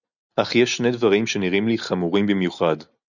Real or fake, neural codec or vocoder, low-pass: real; none; 7.2 kHz